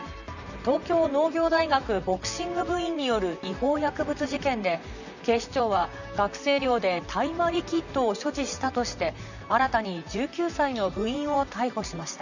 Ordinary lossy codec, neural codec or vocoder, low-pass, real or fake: none; vocoder, 44.1 kHz, 128 mel bands, Pupu-Vocoder; 7.2 kHz; fake